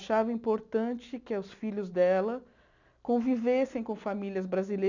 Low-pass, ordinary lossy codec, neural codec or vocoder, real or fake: 7.2 kHz; none; none; real